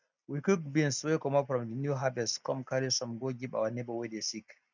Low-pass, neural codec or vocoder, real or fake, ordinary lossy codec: 7.2 kHz; none; real; none